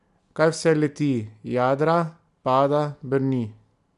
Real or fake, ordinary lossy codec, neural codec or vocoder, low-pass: real; MP3, 96 kbps; none; 10.8 kHz